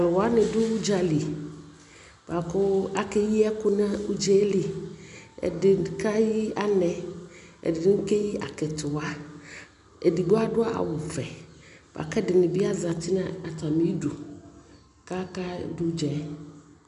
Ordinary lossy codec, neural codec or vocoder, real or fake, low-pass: MP3, 96 kbps; none; real; 10.8 kHz